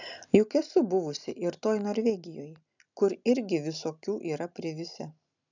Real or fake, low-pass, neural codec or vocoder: real; 7.2 kHz; none